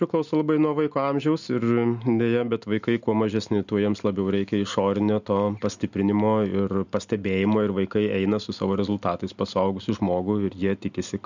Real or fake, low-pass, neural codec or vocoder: real; 7.2 kHz; none